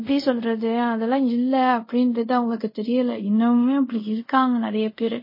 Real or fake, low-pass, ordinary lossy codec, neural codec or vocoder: fake; 5.4 kHz; MP3, 24 kbps; codec, 24 kHz, 0.5 kbps, DualCodec